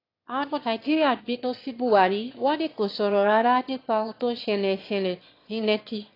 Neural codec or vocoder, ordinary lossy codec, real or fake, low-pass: autoencoder, 22.05 kHz, a latent of 192 numbers a frame, VITS, trained on one speaker; AAC, 32 kbps; fake; 5.4 kHz